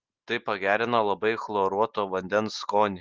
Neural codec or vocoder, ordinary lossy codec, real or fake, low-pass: none; Opus, 16 kbps; real; 7.2 kHz